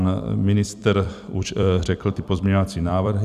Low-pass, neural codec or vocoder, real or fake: 14.4 kHz; vocoder, 44.1 kHz, 128 mel bands every 256 samples, BigVGAN v2; fake